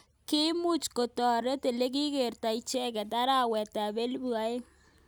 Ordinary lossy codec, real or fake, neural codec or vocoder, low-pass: none; real; none; none